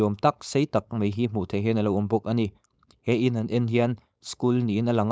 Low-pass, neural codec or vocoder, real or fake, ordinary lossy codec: none; codec, 16 kHz, 4.8 kbps, FACodec; fake; none